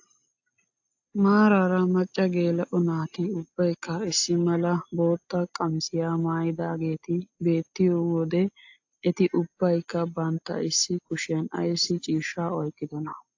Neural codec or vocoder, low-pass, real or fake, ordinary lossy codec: none; 7.2 kHz; real; AAC, 48 kbps